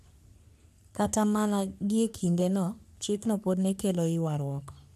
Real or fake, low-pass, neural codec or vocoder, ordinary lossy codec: fake; 14.4 kHz; codec, 44.1 kHz, 3.4 kbps, Pupu-Codec; none